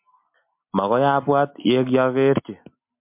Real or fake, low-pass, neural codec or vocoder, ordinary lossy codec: real; 3.6 kHz; none; MP3, 32 kbps